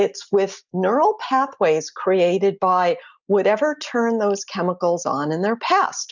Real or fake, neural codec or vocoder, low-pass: real; none; 7.2 kHz